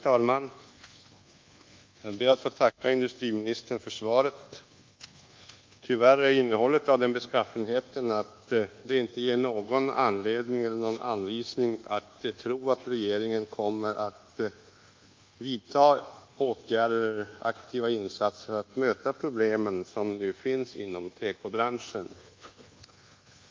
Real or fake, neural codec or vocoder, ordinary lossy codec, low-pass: fake; codec, 24 kHz, 1.2 kbps, DualCodec; Opus, 24 kbps; 7.2 kHz